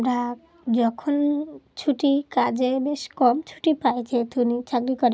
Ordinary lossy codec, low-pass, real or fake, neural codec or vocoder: none; none; real; none